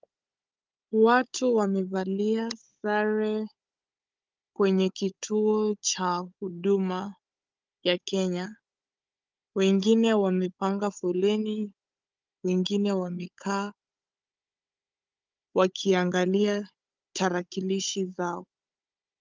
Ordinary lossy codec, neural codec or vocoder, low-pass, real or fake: Opus, 24 kbps; codec, 16 kHz, 16 kbps, FunCodec, trained on Chinese and English, 50 frames a second; 7.2 kHz; fake